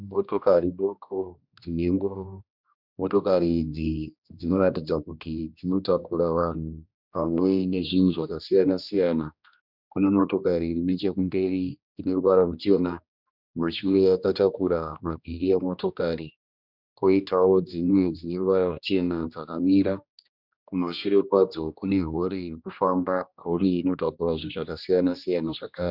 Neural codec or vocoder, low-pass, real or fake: codec, 16 kHz, 1 kbps, X-Codec, HuBERT features, trained on general audio; 5.4 kHz; fake